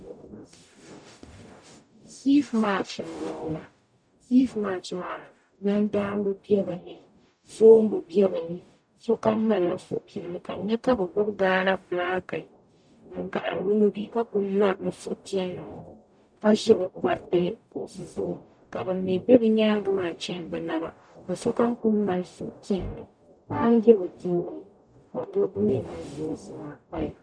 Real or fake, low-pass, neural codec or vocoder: fake; 9.9 kHz; codec, 44.1 kHz, 0.9 kbps, DAC